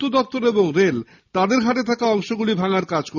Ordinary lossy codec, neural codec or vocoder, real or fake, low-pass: none; none; real; 7.2 kHz